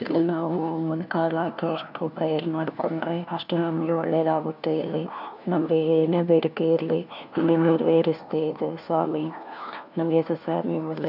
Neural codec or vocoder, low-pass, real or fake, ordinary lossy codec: codec, 16 kHz, 1 kbps, FunCodec, trained on LibriTTS, 50 frames a second; 5.4 kHz; fake; none